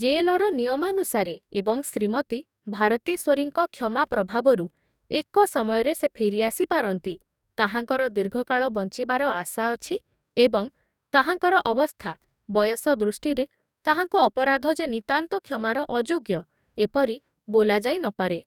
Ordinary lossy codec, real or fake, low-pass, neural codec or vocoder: none; fake; 19.8 kHz; codec, 44.1 kHz, 2.6 kbps, DAC